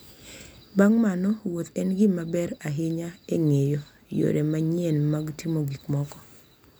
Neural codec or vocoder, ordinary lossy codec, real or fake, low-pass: none; none; real; none